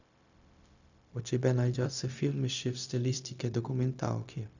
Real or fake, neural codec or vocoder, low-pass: fake; codec, 16 kHz, 0.4 kbps, LongCat-Audio-Codec; 7.2 kHz